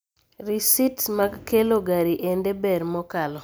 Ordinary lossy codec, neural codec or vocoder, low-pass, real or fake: none; none; none; real